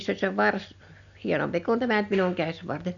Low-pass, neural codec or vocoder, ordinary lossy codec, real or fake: 7.2 kHz; none; none; real